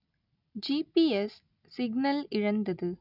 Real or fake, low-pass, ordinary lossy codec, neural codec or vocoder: real; 5.4 kHz; none; none